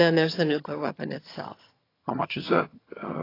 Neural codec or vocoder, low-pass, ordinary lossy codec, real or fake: vocoder, 22.05 kHz, 80 mel bands, HiFi-GAN; 5.4 kHz; AAC, 24 kbps; fake